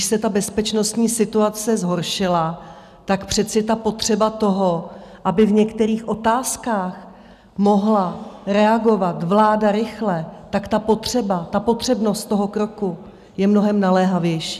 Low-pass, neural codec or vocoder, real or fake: 14.4 kHz; none; real